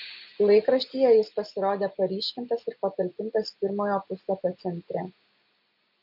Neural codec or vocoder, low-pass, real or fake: none; 5.4 kHz; real